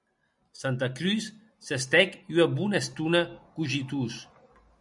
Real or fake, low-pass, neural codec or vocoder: real; 10.8 kHz; none